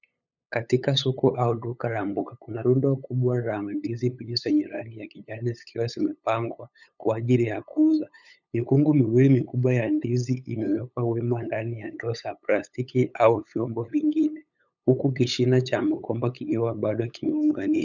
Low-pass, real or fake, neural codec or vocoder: 7.2 kHz; fake; codec, 16 kHz, 8 kbps, FunCodec, trained on LibriTTS, 25 frames a second